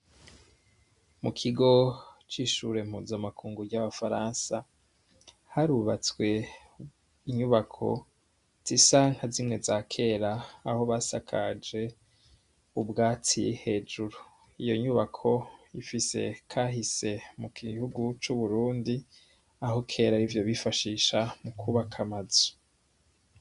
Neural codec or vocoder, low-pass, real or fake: none; 10.8 kHz; real